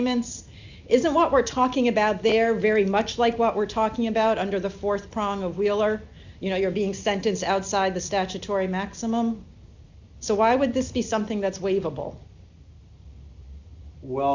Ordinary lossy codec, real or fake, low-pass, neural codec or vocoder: Opus, 64 kbps; real; 7.2 kHz; none